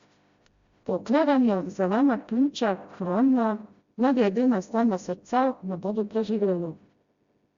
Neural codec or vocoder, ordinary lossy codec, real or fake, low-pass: codec, 16 kHz, 0.5 kbps, FreqCodec, smaller model; Opus, 64 kbps; fake; 7.2 kHz